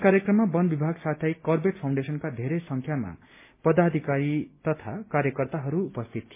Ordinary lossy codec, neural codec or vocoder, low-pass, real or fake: MP3, 24 kbps; none; 3.6 kHz; real